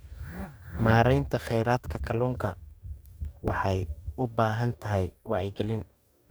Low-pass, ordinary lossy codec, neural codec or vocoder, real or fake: none; none; codec, 44.1 kHz, 2.6 kbps, DAC; fake